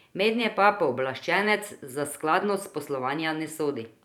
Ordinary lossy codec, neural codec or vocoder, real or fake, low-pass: none; vocoder, 44.1 kHz, 128 mel bands every 512 samples, BigVGAN v2; fake; 19.8 kHz